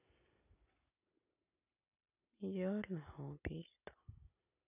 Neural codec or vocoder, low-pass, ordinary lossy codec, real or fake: none; 3.6 kHz; none; real